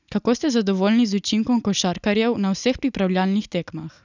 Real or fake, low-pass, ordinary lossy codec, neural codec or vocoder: real; 7.2 kHz; none; none